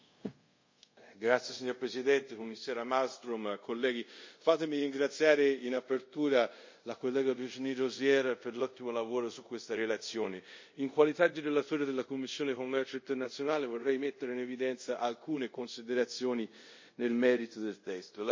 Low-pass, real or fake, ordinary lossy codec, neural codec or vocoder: 7.2 kHz; fake; MP3, 32 kbps; codec, 24 kHz, 0.5 kbps, DualCodec